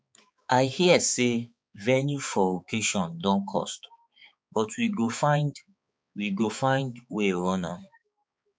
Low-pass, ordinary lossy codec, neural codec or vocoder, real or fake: none; none; codec, 16 kHz, 4 kbps, X-Codec, HuBERT features, trained on balanced general audio; fake